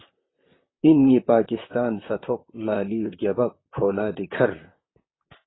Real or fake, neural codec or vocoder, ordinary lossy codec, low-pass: fake; vocoder, 44.1 kHz, 128 mel bands, Pupu-Vocoder; AAC, 16 kbps; 7.2 kHz